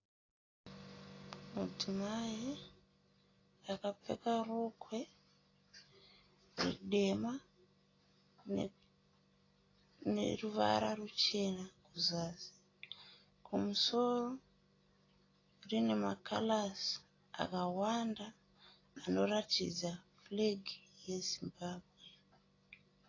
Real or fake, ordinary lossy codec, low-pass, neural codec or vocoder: real; AAC, 32 kbps; 7.2 kHz; none